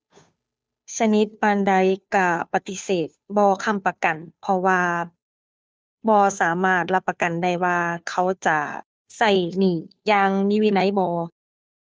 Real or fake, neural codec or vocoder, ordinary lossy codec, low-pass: fake; codec, 16 kHz, 2 kbps, FunCodec, trained on Chinese and English, 25 frames a second; none; none